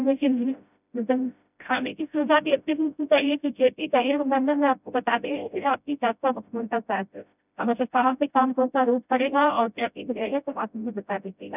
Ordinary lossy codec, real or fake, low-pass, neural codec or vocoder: none; fake; 3.6 kHz; codec, 16 kHz, 0.5 kbps, FreqCodec, smaller model